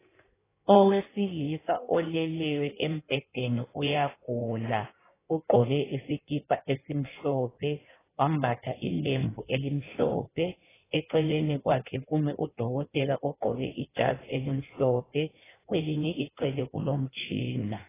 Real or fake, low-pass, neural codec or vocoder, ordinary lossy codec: fake; 3.6 kHz; codec, 16 kHz in and 24 kHz out, 1.1 kbps, FireRedTTS-2 codec; AAC, 16 kbps